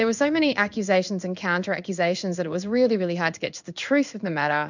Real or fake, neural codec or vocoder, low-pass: fake; codec, 16 kHz in and 24 kHz out, 1 kbps, XY-Tokenizer; 7.2 kHz